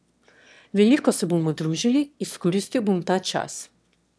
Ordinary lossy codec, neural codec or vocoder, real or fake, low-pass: none; autoencoder, 22.05 kHz, a latent of 192 numbers a frame, VITS, trained on one speaker; fake; none